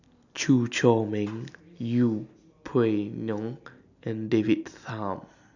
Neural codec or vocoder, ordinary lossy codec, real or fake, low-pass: none; none; real; 7.2 kHz